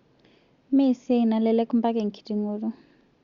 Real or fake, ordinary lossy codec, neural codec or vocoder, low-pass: real; Opus, 64 kbps; none; 7.2 kHz